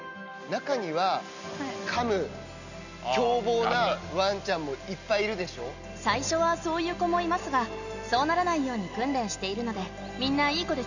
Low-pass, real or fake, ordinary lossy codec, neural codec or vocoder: 7.2 kHz; real; none; none